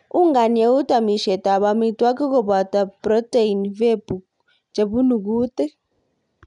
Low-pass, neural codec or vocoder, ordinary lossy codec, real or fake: 10.8 kHz; none; none; real